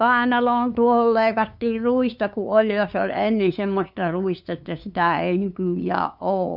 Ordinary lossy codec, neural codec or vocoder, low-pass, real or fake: none; codec, 16 kHz, 2 kbps, FunCodec, trained on LibriTTS, 25 frames a second; 5.4 kHz; fake